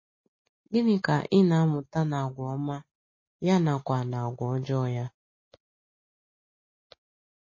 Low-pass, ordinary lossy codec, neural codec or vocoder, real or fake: 7.2 kHz; MP3, 32 kbps; none; real